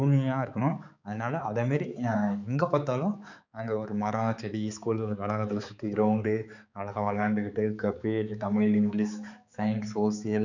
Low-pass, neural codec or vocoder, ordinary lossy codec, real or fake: 7.2 kHz; codec, 16 kHz, 4 kbps, X-Codec, HuBERT features, trained on balanced general audio; none; fake